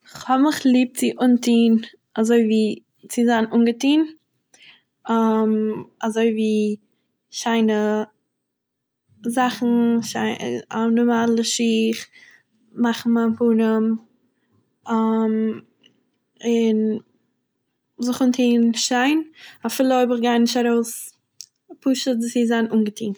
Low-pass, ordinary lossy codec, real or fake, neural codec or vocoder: none; none; real; none